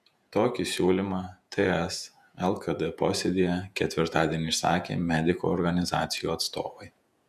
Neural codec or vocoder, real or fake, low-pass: vocoder, 48 kHz, 128 mel bands, Vocos; fake; 14.4 kHz